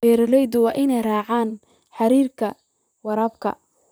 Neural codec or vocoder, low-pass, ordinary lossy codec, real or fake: vocoder, 44.1 kHz, 128 mel bands, Pupu-Vocoder; none; none; fake